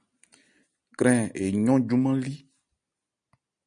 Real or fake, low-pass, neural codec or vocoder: real; 9.9 kHz; none